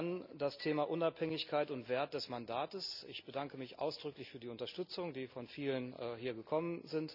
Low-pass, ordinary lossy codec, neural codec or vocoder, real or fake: 5.4 kHz; none; none; real